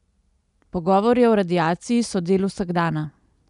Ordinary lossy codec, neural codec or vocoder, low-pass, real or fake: none; none; 10.8 kHz; real